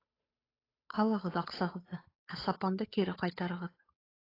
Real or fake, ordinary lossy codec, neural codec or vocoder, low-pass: fake; AAC, 24 kbps; codec, 16 kHz, 8 kbps, FunCodec, trained on Chinese and English, 25 frames a second; 5.4 kHz